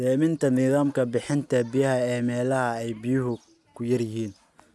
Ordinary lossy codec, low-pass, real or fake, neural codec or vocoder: none; none; real; none